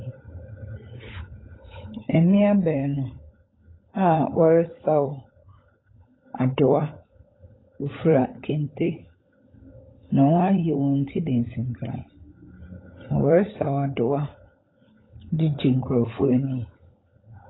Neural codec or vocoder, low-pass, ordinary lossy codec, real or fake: codec, 16 kHz, 16 kbps, FunCodec, trained on LibriTTS, 50 frames a second; 7.2 kHz; AAC, 16 kbps; fake